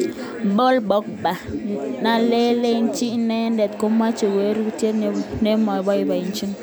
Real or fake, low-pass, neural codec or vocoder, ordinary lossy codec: real; none; none; none